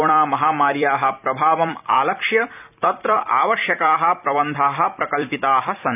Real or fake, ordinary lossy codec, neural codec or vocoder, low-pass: fake; none; vocoder, 44.1 kHz, 128 mel bands every 256 samples, BigVGAN v2; 3.6 kHz